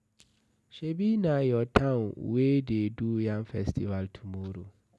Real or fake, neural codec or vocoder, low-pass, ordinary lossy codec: real; none; none; none